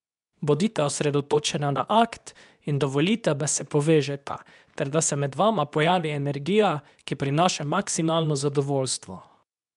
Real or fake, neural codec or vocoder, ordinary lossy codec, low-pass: fake; codec, 24 kHz, 0.9 kbps, WavTokenizer, medium speech release version 2; none; 10.8 kHz